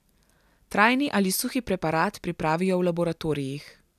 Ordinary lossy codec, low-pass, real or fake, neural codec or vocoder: none; 14.4 kHz; real; none